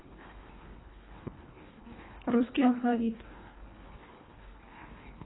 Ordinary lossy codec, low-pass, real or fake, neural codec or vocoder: AAC, 16 kbps; 7.2 kHz; fake; codec, 24 kHz, 1.5 kbps, HILCodec